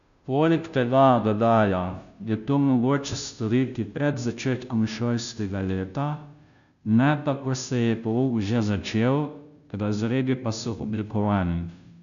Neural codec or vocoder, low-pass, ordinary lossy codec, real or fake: codec, 16 kHz, 0.5 kbps, FunCodec, trained on Chinese and English, 25 frames a second; 7.2 kHz; none; fake